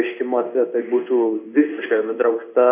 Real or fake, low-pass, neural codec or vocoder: fake; 3.6 kHz; codec, 16 kHz in and 24 kHz out, 1 kbps, XY-Tokenizer